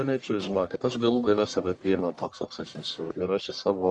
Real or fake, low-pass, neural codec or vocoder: fake; 10.8 kHz; codec, 44.1 kHz, 1.7 kbps, Pupu-Codec